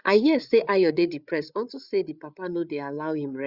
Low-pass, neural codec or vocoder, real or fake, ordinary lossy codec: 5.4 kHz; codec, 16 kHz, 8 kbps, FreqCodec, larger model; fake; Opus, 64 kbps